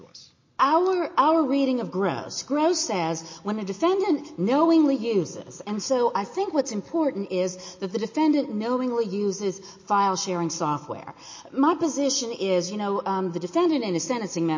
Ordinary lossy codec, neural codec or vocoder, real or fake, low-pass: MP3, 32 kbps; none; real; 7.2 kHz